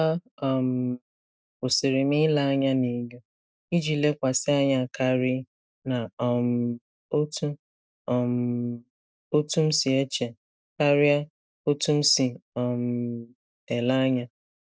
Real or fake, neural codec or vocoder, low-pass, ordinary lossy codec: real; none; none; none